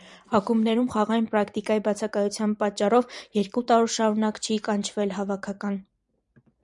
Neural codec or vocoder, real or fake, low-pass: vocoder, 44.1 kHz, 128 mel bands every 512 samples, BigVGAN v2; fake; 10.8 kHz